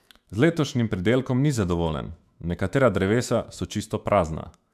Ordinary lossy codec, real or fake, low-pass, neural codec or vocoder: AAC, 96 kbps; fake; 14.4 kHz; autoencoder, 48 kHz, 128 numbers a frame, DAC-VAE, trained on Japanese speech